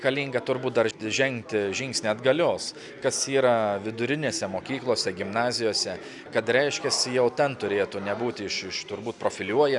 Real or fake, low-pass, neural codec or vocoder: real; 10.8 kHz; none